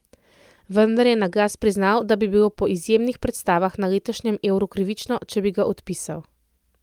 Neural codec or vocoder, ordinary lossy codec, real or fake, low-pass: none; Opus, 32 kbps; real; 19.8 kHz